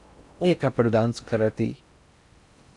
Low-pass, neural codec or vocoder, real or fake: 10.8 kHz; codec, 16 kHz in and 24 kHz out, 0.6 kbps, FocalCodec, streaming, 4096 codes; fake